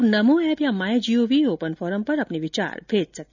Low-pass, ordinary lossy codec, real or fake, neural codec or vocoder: 7.2 kHz; none; real; none